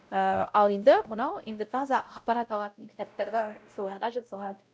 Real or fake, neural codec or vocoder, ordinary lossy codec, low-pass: fake; codec, 16 kHz, 0.5 kbps, X-Codec, WavLM features, trained on Multilingual LibriSpeech; none; none